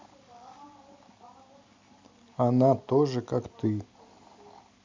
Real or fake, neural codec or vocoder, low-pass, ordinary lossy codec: real; none; 7.2 kHz; MP3, 64 kbps